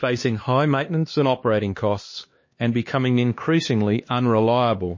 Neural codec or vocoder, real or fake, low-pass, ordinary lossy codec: codec, 16 kHz, 2 kbps, X-Codec, HuBERT features, trained on LibriSpeech; fake; 7.2 kHz; MP3, 32 kbps